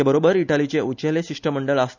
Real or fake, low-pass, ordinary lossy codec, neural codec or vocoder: real; 7.2 kHz; none; none